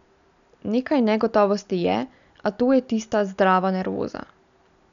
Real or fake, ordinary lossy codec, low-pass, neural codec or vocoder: real; none; 7.2 kHz; none